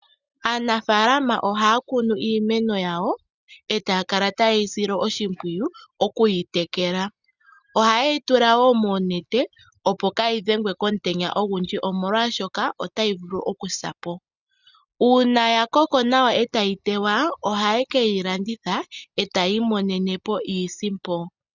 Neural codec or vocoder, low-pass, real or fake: none; 7.2 kHz; real